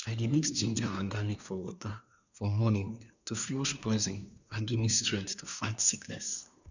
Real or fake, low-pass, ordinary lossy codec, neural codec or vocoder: fake; 7.2 kHz; none; codec, 24 kHz, 1 kbps, SNAC